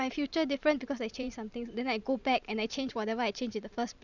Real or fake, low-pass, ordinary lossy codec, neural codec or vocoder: fake; 7.2 kHz; none; vocoder, 44.1 kHz, 128 mel bands every 512 samples, BigVGAN v2